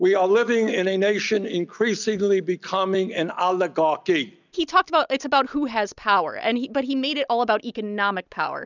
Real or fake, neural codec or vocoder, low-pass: real; none; 7.2 kHz